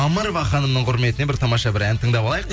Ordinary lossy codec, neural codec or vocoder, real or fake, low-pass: none; none; real; none